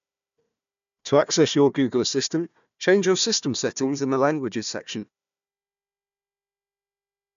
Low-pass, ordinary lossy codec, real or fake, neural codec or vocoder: 7.2 kHz; none; fake; codec, 16 kHz, 1 kbps, FunCodec, trained on Chinese and English, 50 frames a second